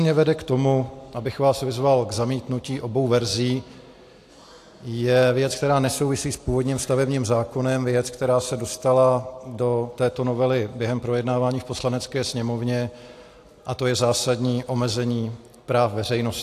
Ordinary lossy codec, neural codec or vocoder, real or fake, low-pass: AAC, 64 kbps; none; real; 14.4 kHz